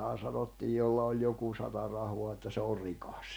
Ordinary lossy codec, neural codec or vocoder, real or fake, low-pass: none; vocoder, 44.1 kHz, 128 mel bands every 256 samples, BigVGAN v2; fake; none